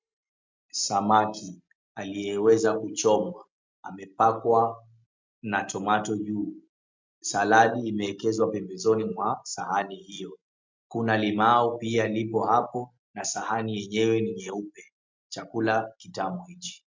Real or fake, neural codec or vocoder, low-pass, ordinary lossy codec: real; none; 7.2 kHz; MP3, 64 kbps